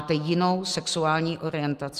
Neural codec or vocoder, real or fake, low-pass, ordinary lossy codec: none; real; 14.4 kHz; Opus, 32 kbps